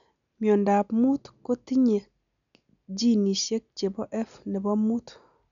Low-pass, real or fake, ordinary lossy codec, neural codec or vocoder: 7.2 kHz; real; none; none